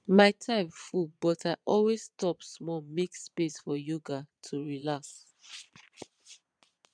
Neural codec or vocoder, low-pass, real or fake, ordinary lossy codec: vocoder, 22.05 kHz, 80 mel bands, Vocos; 9.9 kHz; fake; none